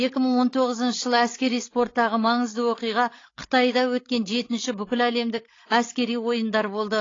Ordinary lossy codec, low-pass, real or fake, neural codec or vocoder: AAC, 32 kbps; 7.2 kHz; fake; codec, 16 kHz, 16 kbps, FreqCodec, larger model